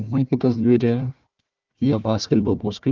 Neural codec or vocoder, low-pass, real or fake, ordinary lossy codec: codec, 16 kHz, 1 kbps, FunCodec, trained on Chinese and English, 50 frames a second; 7.2 kHz; fake; Opus, 24 kbps